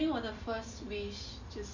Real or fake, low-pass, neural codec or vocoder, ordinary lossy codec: real; 7.2 kHz; none; none